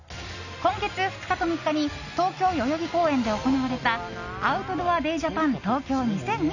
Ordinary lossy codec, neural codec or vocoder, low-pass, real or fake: none; none; 7.2 kHz; real